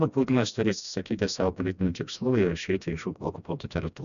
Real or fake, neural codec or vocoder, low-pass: fake; codec, 16 kHz, 1 kbps, FreqCodec, smaller model; 7.2 kHz